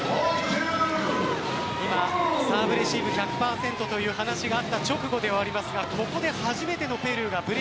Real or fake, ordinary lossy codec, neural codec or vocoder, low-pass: real; none; none; none